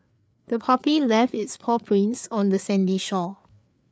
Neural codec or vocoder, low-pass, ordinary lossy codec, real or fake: codec, 16 kHz, 4 kbps, FreqCodec, larger model; none; none; fake